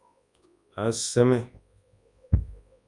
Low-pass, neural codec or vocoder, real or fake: 10.8 kHz; codec, 24 kHz, 0.9 kbps, WavTokenizer, large speech release; fake